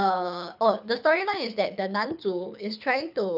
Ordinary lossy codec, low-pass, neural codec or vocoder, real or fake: none; 5.4 kHz; codec, 24 kHz, 6 kbps, HILCodec; fake